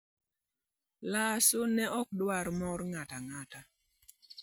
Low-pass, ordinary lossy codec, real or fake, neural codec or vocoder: none; none; real; none